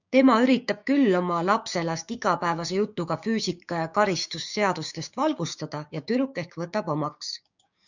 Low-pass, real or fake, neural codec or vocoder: 7.2 kHz; fake; codec, 16 kHz, 6 kbps, DAC